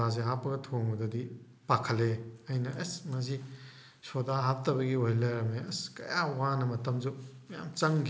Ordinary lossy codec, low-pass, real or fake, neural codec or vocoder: none; none; real; none